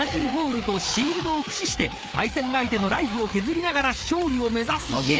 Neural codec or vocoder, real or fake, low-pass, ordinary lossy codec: codec, 16 kHz, 4 kbps, FreqCodec, larger model; fake; none; none